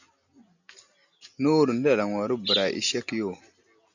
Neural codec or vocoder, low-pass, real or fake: none; 7.2 kHz; real